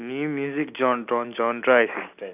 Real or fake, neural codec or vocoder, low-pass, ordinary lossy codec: real; none; 3.6 kHz; none